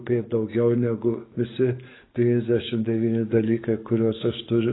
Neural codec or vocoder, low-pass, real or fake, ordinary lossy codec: codec, 44.1 kHz, 7.8 kbps, DAC; 7.2 kHz; fake; AAC, 16 kbps